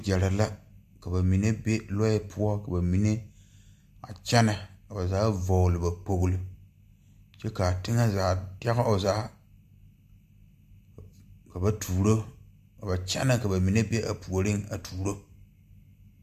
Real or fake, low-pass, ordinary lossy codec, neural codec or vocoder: real; 14.4 kHz; AAC, 96 kbps; none